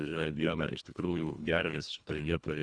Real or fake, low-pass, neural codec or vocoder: fake; 9.9 kHz; codec, 24 kHz, 1.5 kbps, HILCodec